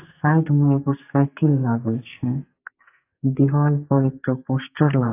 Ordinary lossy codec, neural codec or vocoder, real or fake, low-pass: AAC, 24 kbps; codec, 44.1 kHz, 2.6 kbps, SNAC; fake; 3.6 kHz